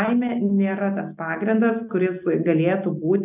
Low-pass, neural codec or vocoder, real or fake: 3.6 kHz; none; real